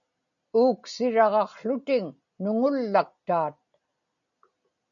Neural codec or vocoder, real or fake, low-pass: none; real; 7.2 kHz